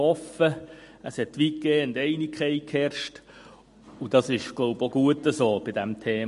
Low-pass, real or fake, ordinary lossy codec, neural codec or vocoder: 14.4 kHz; real; MP3, 48 kbps; none